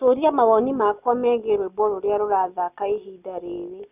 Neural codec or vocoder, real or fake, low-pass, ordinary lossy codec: none; real; 3.6 kHz; none